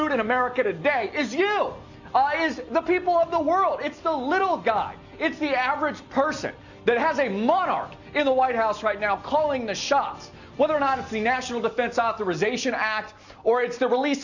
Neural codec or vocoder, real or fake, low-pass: none; real; 7.2 kHz